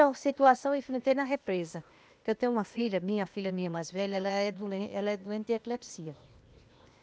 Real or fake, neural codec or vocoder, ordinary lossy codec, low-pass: fake; codec, 16 kHz, 0.8 kbps, ZipCodec; none; none